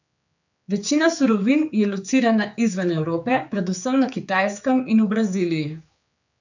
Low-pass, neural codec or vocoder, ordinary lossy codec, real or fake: 7.2 kHz; codec, 16 kHz, 4 kbps, X-Codec, HuBERT features, trained on general audio; none; fake